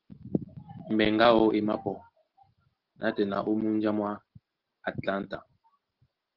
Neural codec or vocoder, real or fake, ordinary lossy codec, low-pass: none; real; Opus, 16 kbps; 5.4 kHz